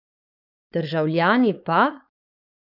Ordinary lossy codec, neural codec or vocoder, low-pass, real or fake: none; codec, 16 kHz, 4.8 kbps, FACodec; 5.4 kHz; fake